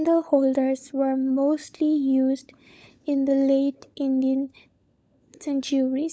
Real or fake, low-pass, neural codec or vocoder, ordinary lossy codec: fake; none; codec, 16 kHz, 16 kbps, FunCodec, trained on LibriTTS, 50 frames a second; none